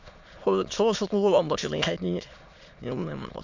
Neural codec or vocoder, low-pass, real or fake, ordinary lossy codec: autoencoder, 22.05 kHz, a latent of 192 numbers a frame, VITS, trained on many speakers; 7.2 kHz; fake; MP3, 64 kbps